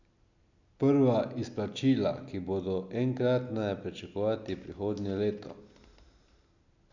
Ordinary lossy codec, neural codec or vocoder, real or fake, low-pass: none; none; real; 7.2 kHz